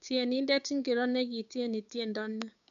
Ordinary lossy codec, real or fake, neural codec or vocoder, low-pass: none; fake; codec, 16 kHz, 6 kbps, DAC; 7.2 kHz